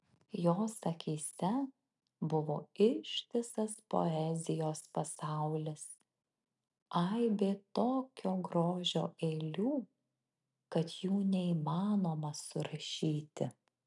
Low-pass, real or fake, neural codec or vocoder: 10.8 kHz; real; none